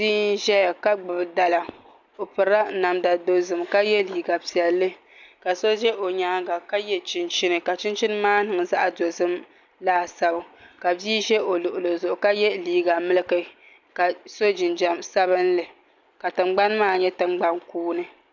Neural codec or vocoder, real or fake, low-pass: none; real; 7.2 kHz